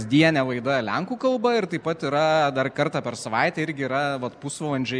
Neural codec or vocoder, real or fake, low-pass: none; real; 9.9 kHz